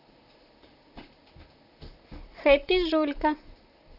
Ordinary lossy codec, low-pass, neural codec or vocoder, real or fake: none; 5.4 kHz; vocoder, 44.1 kHz, 128 mel bands, Pupu-Vocoder; fake